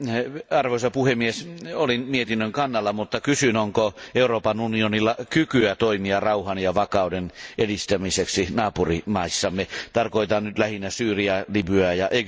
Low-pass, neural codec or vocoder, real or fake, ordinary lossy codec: none; none; real; none